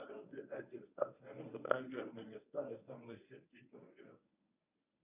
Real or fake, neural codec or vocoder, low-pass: fake; codec, 24 kHz, 0.9 kbps, WavTokenizer, medium speech release version 1; 3.6 kHz